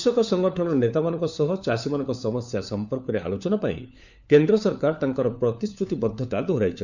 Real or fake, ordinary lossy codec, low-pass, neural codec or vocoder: fake; none; 7.2 kHz; codec, 16 kHz, 4 kbps, FunCodec, trained on LibriTTS, 50 frames a second